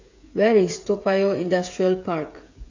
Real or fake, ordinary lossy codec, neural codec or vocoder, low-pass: fake; AAC, 48 kbps; codec, 16 kHz, 8 kbps, FreqCodec, smaller model; 7.2 kHz